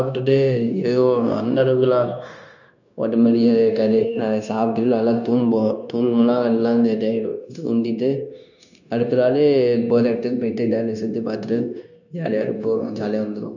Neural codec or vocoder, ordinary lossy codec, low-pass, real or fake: codec, 16 kHz, 0.9 kbps, LongCat-Audio-Codec; none; 7.2 kHz; fake